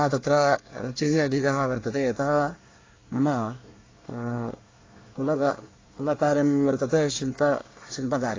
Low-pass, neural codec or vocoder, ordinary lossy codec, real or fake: 7.2 kHz; codec, 24 kHz, 1 kbps, SNAC; AAC, 32 kbps; fake